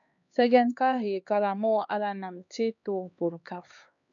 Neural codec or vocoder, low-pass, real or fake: codec, 16 kHz, 2 kbps, X-Codec, HuBERT features, trained on LibriSpeech; 7.2 kHz; fake